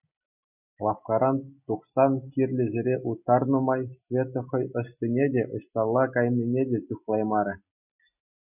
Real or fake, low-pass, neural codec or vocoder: real; 3.6 kHz; none